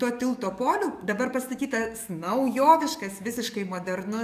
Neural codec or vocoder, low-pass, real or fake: codec, 44.1 kHz, 7.8 kbps, DAC; 14.4 kHz; fake